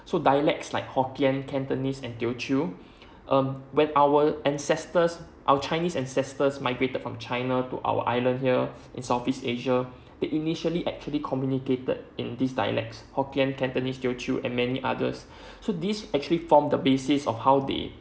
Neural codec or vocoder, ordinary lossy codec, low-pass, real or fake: none; none; none; real